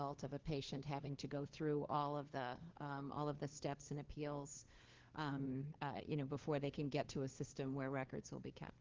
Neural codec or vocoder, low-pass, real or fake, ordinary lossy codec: codec, 16 kHz, 4 kbps, FunCodec, trained on LibriTTS, 50 frames a second; 7.2 kHz; fake; Opus, 16 kbps